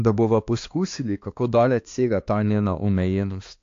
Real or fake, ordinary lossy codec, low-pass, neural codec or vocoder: fake; AAC, 48 kbps; 7.2 kHz; codec, 16 kHz, 2 kbps, X-Codec, HuBERT features, trained on balanced general audio